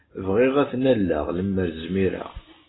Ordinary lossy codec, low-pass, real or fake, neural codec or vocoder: AAC, 16 kbps; 7.2 kHz; real; none